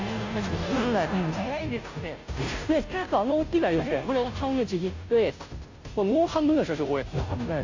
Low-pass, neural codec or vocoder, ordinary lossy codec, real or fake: 7.2 kHz; codec, 16 kHz, 0.5 kbps, FunCodec, trained on Chinese and English, 25 frames a second; AAC, 48 kbps; fake